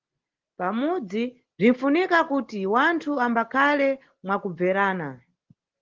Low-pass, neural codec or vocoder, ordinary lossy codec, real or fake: 7.2 kHz; none; Opus, 16 kbps; real